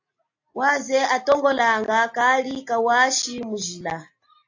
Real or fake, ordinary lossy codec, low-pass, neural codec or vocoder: real; AAC, 48 kbps; 7.2 kHz; none